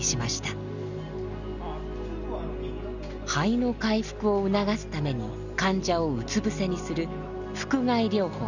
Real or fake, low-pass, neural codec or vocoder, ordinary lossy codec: real; 7.2 kHz; none; none